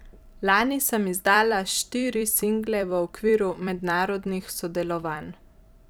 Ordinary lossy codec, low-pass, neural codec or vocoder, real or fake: none; none; vocoder, 44.1 kHz, 128 mel bands every 256 samples, BigVGAN v2; fake